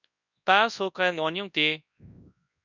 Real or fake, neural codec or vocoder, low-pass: fake; codec, 24 kHz, 0.9 kbps, WavTokenizer, large speech release; 7.2 kHz